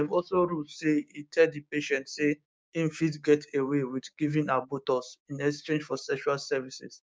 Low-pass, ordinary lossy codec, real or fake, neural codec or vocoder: none; none; fake; codec, 16 kHz, 6 kbps, DAC